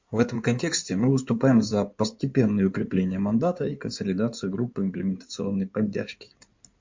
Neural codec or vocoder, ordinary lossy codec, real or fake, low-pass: codec, 16 kHz in and 24 kHz out, 2.2 kbps, FireRedTTS-2 codec; MP3, 48 kbps; fake; 7.2 kHz